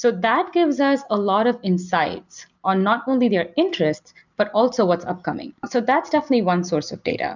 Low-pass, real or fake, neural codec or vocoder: 7.2 kHz; real; none